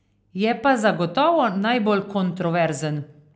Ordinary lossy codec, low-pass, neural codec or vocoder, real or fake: none; none; none; real